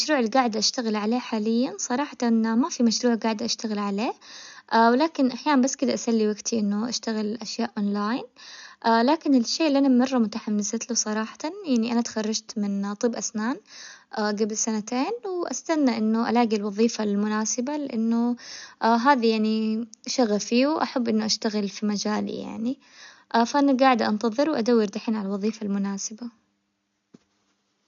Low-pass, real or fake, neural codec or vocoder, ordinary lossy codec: 7.2 kHz; real; none; none